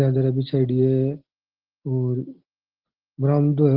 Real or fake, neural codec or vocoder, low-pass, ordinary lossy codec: real; none; 5.4 kHz; Opus, 16 kbps